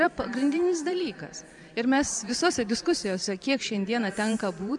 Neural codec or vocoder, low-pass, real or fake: vocoder, 44.1 kHz, 128 mel bands every 512 samples, BigVGAN v2; 10.8 kHz; fake